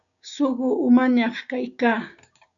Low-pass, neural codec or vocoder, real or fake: 7.2 kHz; codec, 16 kHz, 6 kbps, DAC; fake